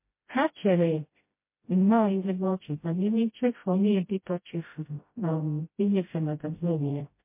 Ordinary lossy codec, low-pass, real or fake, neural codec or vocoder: MP3, 24 kbps; 3.6 kHz; fake; codec, 16 kHz, 0.5 kbps, FreqCodec, smaller model